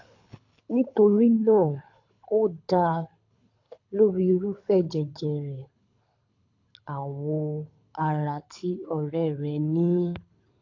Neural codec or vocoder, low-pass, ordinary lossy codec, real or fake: codec, 24 kHz, 6 kbps, HILCodec; 7.2 kHz; AAC, 48 kbps; fake